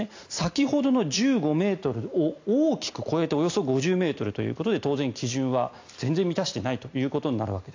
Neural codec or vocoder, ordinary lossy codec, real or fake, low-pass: none; AAC, 48 kbps; real; 7.2 kHz